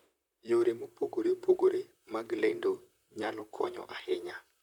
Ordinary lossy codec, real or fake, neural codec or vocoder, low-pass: none; fake; vocoder, 44.1 kHz, 128 mel bands, Pupu-Vocoder; 19.8 kHz